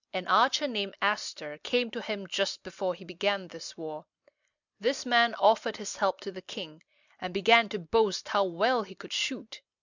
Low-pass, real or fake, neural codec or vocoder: 7.2 kHz; real; none